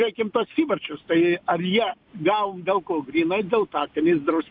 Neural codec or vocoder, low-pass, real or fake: none; 5.4 kHz; real